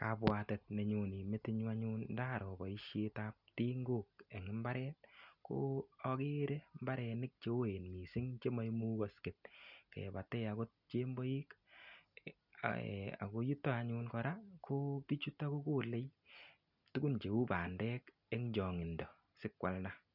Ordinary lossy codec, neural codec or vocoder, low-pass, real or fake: none; none; 5.4 kHz; real